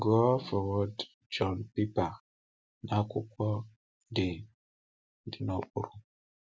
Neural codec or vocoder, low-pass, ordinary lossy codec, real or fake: none; none; none; real